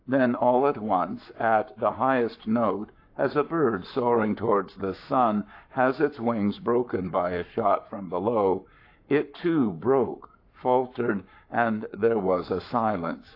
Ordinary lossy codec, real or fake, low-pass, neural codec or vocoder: AAC, 32 kbps; fake; 5.4 kHz; codec, 44.1 kHz, 7.8 kbps, Pupu-Codec